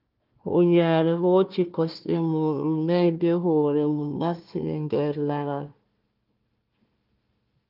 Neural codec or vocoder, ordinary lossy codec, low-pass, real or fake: codec, 16 kHz, 1 kbps, FunCodec, trained on Chinese and English, 50 frames a second; Opus, 32 kbps; 5.4 kHz; fake